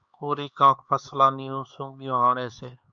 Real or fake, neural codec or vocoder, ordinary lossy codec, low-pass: fake; codec, 16 kHz, 4 kbps, X-Codec, HuBERT features, trained on LibriSpeech; Opus, 64 kbps; 7.2 kHz